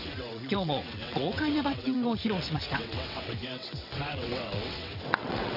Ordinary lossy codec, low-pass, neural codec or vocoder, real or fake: AAC, 48 kbps; 5.4 kHz; vocoder, 22.05 kHz, 80 mel bands, WaveNeXt; fake